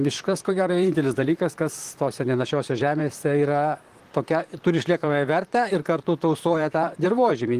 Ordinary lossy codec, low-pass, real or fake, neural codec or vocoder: Opus, 24 kbps; 14.4 kHz; fake; vocoder, 44.1 kHz, 128 mel bands, Pupu-Vocoder